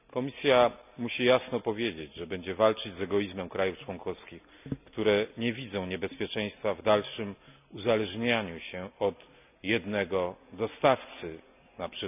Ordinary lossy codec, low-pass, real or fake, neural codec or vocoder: none; 3.6 kHz; real; none